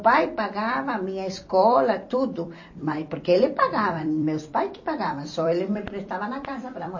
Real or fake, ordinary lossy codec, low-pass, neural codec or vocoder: fake; MP3, 32 kbps; 7.2 kHz; vocoder, 44.1 kHz, 128 mel bands every 256 samples, BigVGAN v2